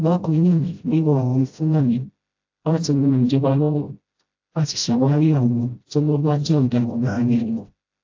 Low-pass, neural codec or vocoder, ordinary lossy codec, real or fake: 7.2 kHz; codec, 16 kHz, 0.5 kbps, FreqCodec, smaller model; AAC, 48 kbps; fake